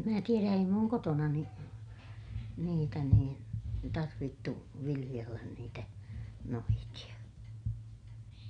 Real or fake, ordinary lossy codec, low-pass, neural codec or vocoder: real; none; 9.9 kHz; none